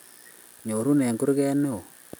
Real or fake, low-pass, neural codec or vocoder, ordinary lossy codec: real; none; none; none